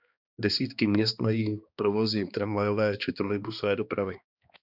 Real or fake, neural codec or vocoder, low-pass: fake; codec, 16 kHz, 2 kbps, X-Codec, HuBERT features, trained on balanced general audio; 5.4 kHz